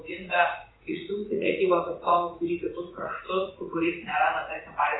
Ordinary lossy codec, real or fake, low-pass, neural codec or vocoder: AAC, 16 kbps; fake; 7.2 kHz; vocoder, 44.1 kHz, 80 mel bands, Vocos